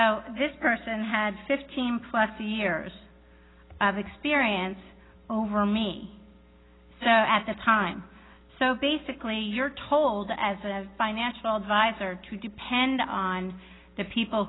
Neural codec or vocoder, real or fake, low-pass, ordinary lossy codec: none; real; 7.2 kHz; AAC, 16 kbps